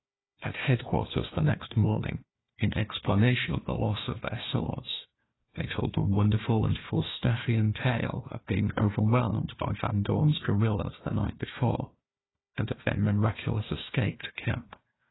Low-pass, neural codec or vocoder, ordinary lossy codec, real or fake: 7.2 kHz; codec, 16 kHz, 1 kbps, FunCodec, trained on Chinese and English, 50 frames a second; AAC, 16 kbps; fake